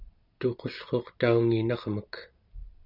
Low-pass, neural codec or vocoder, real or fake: 5.4 kHz; none; real